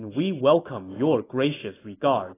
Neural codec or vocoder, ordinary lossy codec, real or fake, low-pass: none; AAC, 16 kbps; real; 3.6 kHz